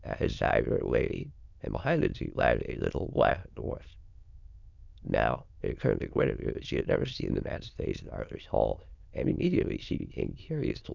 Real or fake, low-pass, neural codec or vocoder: fake; 7.2 kHz; autoencoder, 22.05 kHz, a latent of 192 numbers a frame, VITS, trained on many speakers